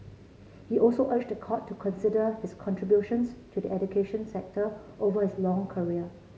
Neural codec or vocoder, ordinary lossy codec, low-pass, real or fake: none; none; none; real